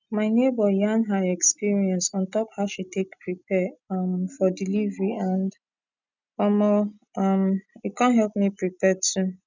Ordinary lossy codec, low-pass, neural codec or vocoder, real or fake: none; 7.2 kHz; none; real